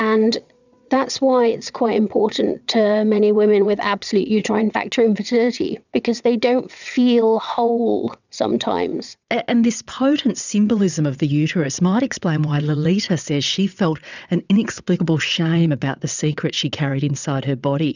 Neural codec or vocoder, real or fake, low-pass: vocoder, 22.05 kHz, 80 mel bands, WaveNeXt; fake; 7.2 kHz